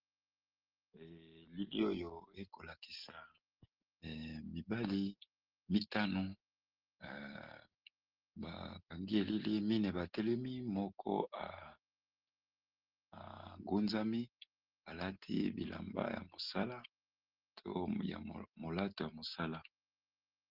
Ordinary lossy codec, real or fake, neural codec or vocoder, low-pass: Opus, 16 kbps; real; none; 5.4 kHz